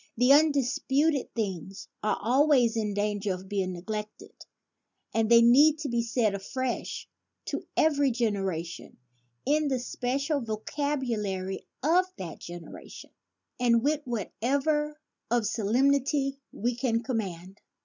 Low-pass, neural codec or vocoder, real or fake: 7.2 kHz; none; real